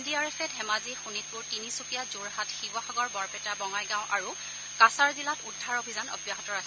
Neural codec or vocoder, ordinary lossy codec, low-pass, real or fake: none; none; none; real